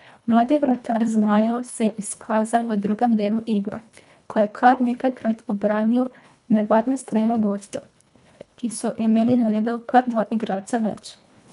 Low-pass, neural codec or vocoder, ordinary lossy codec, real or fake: 10.8 kHz; codec, 24 kHz, 1.5 kbps, HILCodec; none; fake